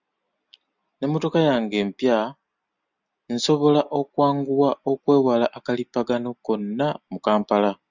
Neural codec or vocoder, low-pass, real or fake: none; 7.2 kHz; real